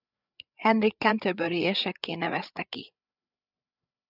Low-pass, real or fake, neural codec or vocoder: 5.4 kHz; fake; codec, 16 kHz, 8 kbps, FreqCodec, larger model